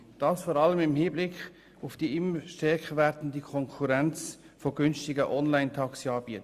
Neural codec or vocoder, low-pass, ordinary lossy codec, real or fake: none; 14.4 kHz; Opus, 64 kbps; real